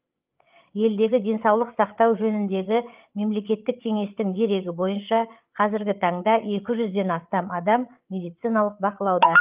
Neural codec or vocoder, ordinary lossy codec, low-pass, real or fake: codec, 16 kHz, 8 kbps, FreqCodec, larger model; Opus, 24 kbps; 3.6 kHz; fake